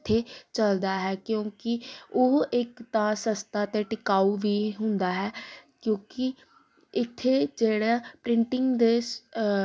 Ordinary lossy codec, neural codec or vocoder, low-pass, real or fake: none; none; none; real